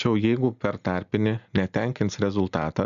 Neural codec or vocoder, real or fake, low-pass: none; real; 7.2 kHz